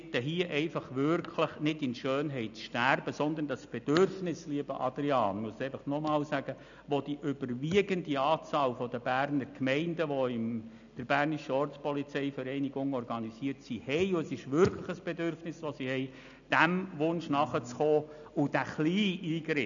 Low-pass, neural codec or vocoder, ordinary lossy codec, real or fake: 7.2 kHz; none; MP3, 96 kbps; real